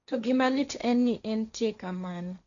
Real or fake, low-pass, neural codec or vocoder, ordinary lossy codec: fake; 7.2 kHz; codec, 16 kHz, 1.1 kbps, Voila-Tokenizer; none